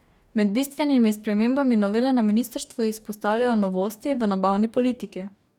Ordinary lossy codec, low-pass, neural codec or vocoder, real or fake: none; 19.8 kHz; codec, 44.1 kHz, 2.6 kbps, DAC; fake